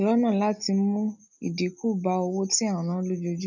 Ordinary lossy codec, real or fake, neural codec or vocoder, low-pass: none; real; none; 7.2 kHz